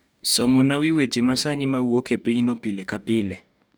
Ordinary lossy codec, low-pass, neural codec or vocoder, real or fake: none; none; codec, 44.1 kHz, 2.6 kbps, DAC; fake